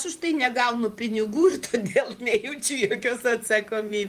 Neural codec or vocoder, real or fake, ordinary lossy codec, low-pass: vocoder, 44.1 kHz, 128 mel bands, Pupu-Vocoder; fake; Opus, 32 kbps; 14.4 kHz